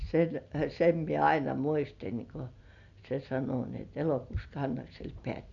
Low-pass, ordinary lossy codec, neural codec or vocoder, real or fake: 7.2 kHz; none; none; real